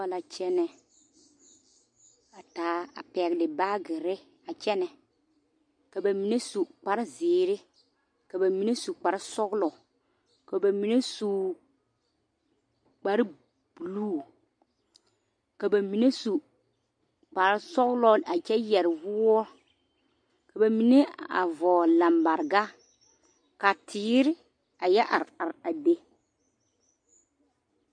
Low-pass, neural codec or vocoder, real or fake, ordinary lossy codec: 9.9 kHz; none; real; MP3, 48 kbps